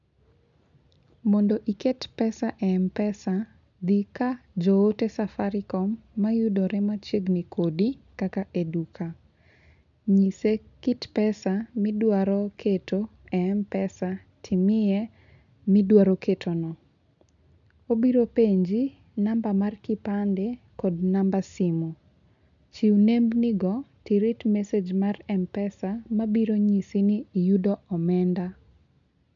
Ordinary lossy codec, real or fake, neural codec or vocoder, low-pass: none; real; none; 7.2 kHz